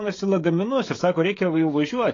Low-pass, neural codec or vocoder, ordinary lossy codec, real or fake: 7.2 kHz; codec, 16 kHz, 16 kbps, FreqCodec, smaller model; AAC, 32 kbps; fake